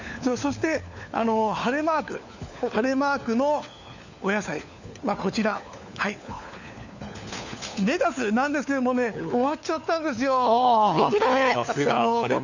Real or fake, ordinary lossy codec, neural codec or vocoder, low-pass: fake; none; codec, 16 kHz, 4 kbps, FunCodec, trained on LibriTTS, 50 frames a second; 7.2 kHz